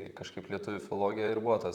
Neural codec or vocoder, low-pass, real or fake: vocoder, 44.1 kHz, 128 mel bands, Pupu-Vocoder; 19.8 kHz; fake